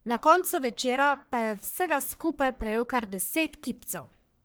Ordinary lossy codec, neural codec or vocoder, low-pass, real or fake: none; codec, 44.1 kHz, 1.7 kbps, Pupu-Codec; none; fake